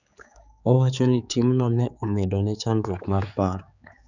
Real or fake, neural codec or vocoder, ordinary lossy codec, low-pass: fake; codec, 16 kHz, 4 kbps, X-Codec, HuBERT features, trained on general audio; none; 7.2 kHz